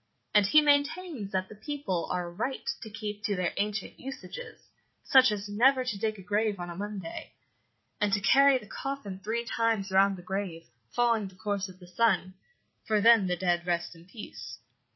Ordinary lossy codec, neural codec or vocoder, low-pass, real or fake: MP3, 24 kbps; vocoder, 44.1 kHz, 80 mel bands, Vocos; 7.2 kHz; fake